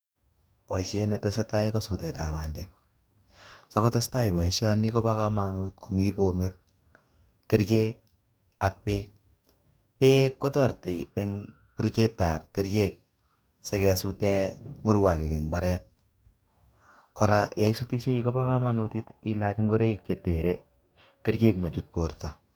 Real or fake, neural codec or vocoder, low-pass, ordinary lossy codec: fake; codec, 44.1 kHz, 2.6 kbps, DAC; none; none